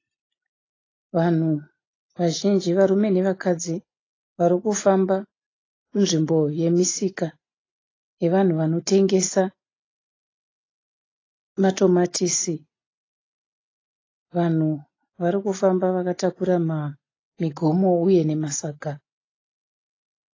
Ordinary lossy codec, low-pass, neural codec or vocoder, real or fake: AAC, 32 kbps; 7.2 kHz; none; real